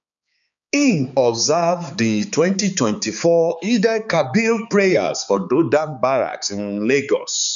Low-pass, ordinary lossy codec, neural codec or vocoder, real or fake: 7.2 kHz; none; codec, 16 kHz, 4 kbps, X-Codec, HuBERT features, trained on balanced general audio; fake